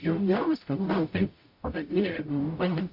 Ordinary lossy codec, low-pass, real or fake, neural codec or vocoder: MP3, 32 kbps; 5.4 kHz; fake; codec, 44.1 kHz, 0.9 kbps, DAC